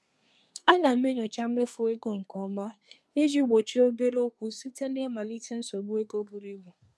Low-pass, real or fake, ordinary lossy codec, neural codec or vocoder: none; fake; none; codec, 24 kHz, 1 kbps, SNAC